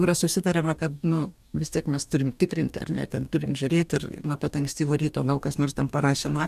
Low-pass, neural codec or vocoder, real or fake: 14.4 kHz; codec, 44.1 kHz, 2.6 kbps, DAC; fake